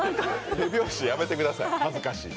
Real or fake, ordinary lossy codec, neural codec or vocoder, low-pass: real; none; none; none